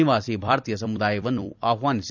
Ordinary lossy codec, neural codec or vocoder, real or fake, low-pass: none; vocoder, 44.1 kHz, 128 mel bands every 256 samples, BigVGAN v2; fake; 7.2 kHz